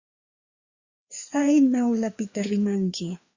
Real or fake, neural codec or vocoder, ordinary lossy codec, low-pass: fake; codec, 16 kHz, 2 kbps, FreqCodec, larger model; Opus, 64 kbps; 7.2 kHz